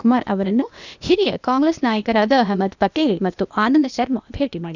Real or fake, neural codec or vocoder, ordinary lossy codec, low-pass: fake; codec, 16 kHz, 0.8 kbps, ZipCodec; none; 7.2 kHz